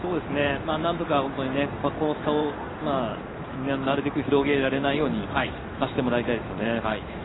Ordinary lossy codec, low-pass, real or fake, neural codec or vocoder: AAC, 16 kbps; 7.2 kHz; fake; codec, 16 kHz in and 24 kHz out, 1 kbps, XY-Tokenizer